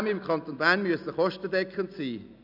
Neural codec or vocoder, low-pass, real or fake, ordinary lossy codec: none; 5.4 kHz; real; none